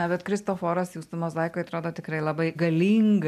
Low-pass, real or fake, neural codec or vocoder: 14.4 kHz; real; none